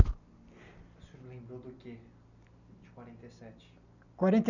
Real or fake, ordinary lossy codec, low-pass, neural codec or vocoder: real; none; 7.2 kHz; none